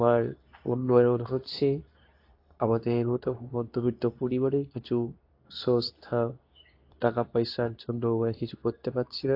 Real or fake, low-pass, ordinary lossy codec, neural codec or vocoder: fake; 5.4 kHz; AAC, 32 kbps; codec, 24 kHz, 0.9 kbps, WavTokenizer, medium speech release version 2